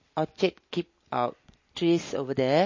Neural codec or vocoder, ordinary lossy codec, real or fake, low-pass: none; MP3, 32 kbps; real; 7.2 kHz